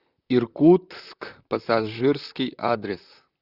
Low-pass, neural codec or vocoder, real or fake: 5.4 kHz; vocoder, 44.1 kHz, 128 mel bands, Pupu-Vocoder; fake